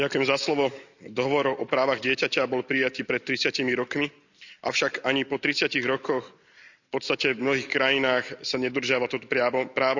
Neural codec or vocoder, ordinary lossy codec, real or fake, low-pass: none; none; real; 7.2 kHz